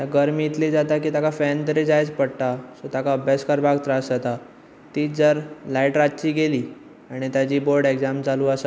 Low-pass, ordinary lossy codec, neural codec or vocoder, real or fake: none; none; none; real